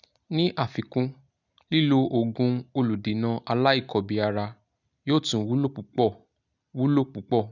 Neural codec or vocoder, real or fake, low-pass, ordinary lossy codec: none; real; 7.2 kHz; none